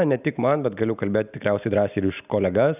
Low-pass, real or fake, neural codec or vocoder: 3.6 kHz; real; none